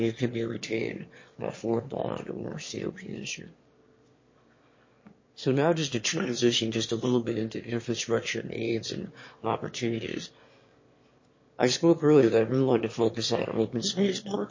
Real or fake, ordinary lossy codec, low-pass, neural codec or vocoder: fake; MP3, 32 kbps; 7.2 kHz; autoencoder, 22.05 kHz, a latent of 192 numbers a frame, VITS, trained on one speaker